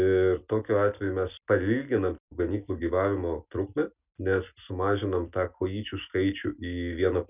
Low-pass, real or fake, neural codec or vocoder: 3.6 kHz; real; none